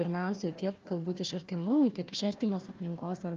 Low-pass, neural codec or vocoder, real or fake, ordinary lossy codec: 7.2 kHz; codec, 16 kHz, 1 kbps, FunCodec, trained on Chinese and English, 50 frames a second; fake; Opus, 32 kbps